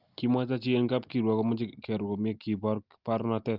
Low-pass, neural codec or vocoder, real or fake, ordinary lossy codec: 5.4 kHz; none; real; Opus, 32 kbps